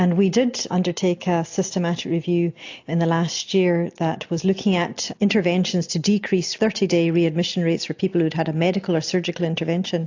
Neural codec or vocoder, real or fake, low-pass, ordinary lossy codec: none; real; 7.2 kHz; AAC, 48 kbps